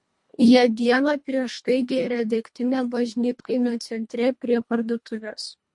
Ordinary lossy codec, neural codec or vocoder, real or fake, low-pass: MP3, 48 kbps; codec, 24 kHz, 1.5 kbps, HILCodec; fake; 10.8 kHz